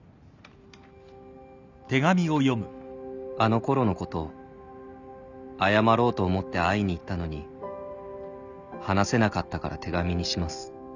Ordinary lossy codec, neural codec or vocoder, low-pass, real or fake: none; none; 7.2 kHz; real